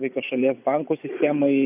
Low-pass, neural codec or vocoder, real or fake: 3.6 kHz; vocoder, 24 kHz, 100 mel bands, Vocos; fake